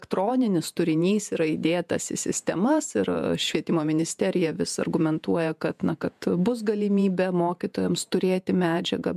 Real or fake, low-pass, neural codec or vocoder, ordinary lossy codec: fake; 14.4 kHz; vocoder, 48 kHz, 128 mel bands, Vocos; MP3, 96 kbps